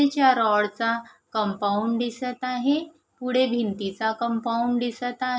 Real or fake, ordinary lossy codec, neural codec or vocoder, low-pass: real; none; none; none